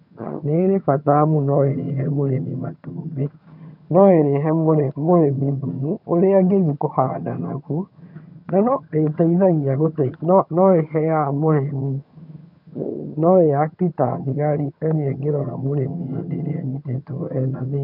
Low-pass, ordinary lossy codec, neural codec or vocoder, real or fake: 5.4 kHz; none; vocoder, 22.05 kHz, 80 mel bands, HiFi-GAN; fake